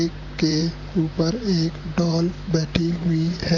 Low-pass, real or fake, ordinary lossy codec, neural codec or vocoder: 7.2 kHz; fake; MP3, 64 kbps; vocoder, 44.1 kHz, 128 mel bands, Pupu-Vocoder